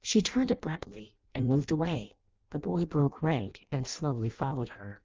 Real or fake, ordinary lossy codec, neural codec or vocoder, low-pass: fake; Opus, 24 kbps; codec, 16 kHz in and 24 kHz out, 0.6 kbps, FireRedTTS-2 codec; 7.2 kHz